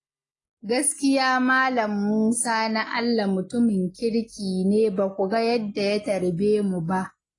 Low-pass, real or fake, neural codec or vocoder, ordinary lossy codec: 10.8 kHz; real; none; AAC, 32 kbps